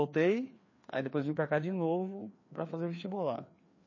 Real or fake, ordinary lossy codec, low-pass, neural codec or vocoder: fake; MP3, 32 kbps; 7.2 kHz; codec, 16 kHz, 2 kbps, FreqCodec, larger model